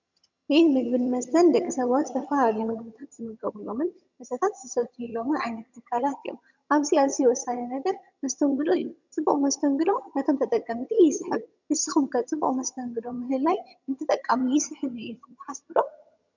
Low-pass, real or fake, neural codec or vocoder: 7.2 kHz; fake; vocoder, 22.05 kHz, 80 mel bands, HiFi-GAN